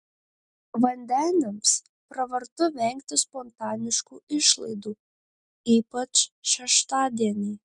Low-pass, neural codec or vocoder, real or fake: 10.8 kHz; none; real